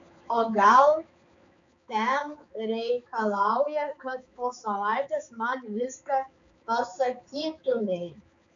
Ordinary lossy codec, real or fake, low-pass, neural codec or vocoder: MP3, 64 kbps; fake; 7.2 kHz; codec, 16 kHz, 4 kbps, X-Codec, HuBERT features, trained on balanced general audio